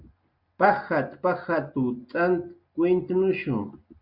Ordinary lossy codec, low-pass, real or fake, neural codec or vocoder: Opus, 64 kbps; 5.4 kHz; real; none